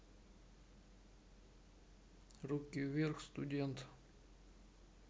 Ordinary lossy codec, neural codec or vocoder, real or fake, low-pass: none; none; real; none